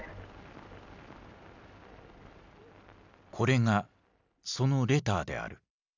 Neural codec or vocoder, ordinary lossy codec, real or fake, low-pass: none; none; real; 7.2 kHz